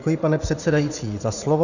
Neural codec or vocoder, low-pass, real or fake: vocoder, 44.1 kHz, 80 mel bands, Vocos; 7.2 kHz; fake